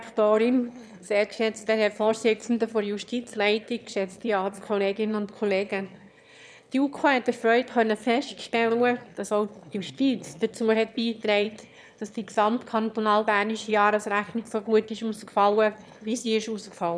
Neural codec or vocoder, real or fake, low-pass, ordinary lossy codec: autoencoder, 22.05 kHz, a latent of 192 numbers a frame, VITS, trained on one speaker; fake; none; none